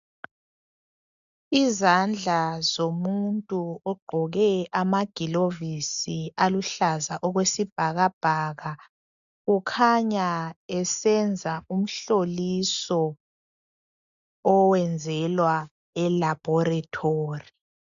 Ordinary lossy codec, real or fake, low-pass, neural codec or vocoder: AAC, 96 kbps; real; 7.2 kHz; none